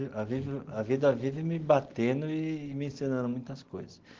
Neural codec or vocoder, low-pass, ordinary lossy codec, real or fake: none; 7.2 kHz; Opus, 16 kbps; real